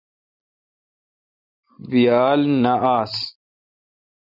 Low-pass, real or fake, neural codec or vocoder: 5.4 kHz; real; none